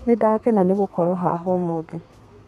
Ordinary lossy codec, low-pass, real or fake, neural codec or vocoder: none; 14.4 kHz; fake; codec, 32 kHz, 1.9 kbps, SNAC